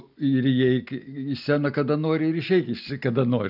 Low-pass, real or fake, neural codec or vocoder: 5.4 kHz; real; none